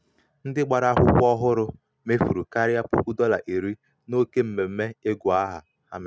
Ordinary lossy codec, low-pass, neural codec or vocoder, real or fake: none; none; none; real